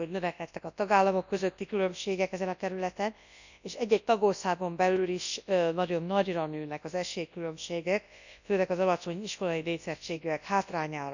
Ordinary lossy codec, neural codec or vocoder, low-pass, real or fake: none; codec, 24 kHz, 0.9 kbps, WavTokenizer, large speech release; 7.2 kHz; fake